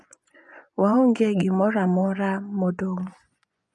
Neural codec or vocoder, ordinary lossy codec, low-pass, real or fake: vocoder, 24 kHz, 100 mel bands, Vocos; none; none; fake